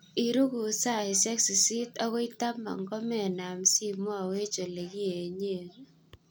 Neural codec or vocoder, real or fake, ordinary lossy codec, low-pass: none; real; none; none